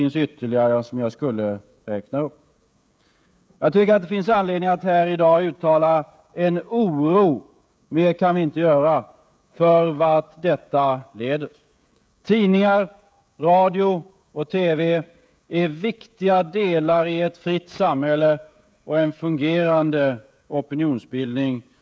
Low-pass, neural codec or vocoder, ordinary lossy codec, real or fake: none; codec, 16 kHz, 16 kbps, FreqCodec, smaller model; none; fake